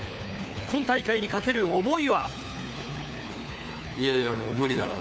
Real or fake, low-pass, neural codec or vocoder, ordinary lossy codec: fake; none; codec, 16 kHz, 4 kbps, FunCodec, trained on LibriTTS, 50 frames a second; none